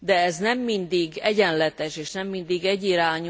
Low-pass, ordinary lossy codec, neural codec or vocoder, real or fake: none; none; none; real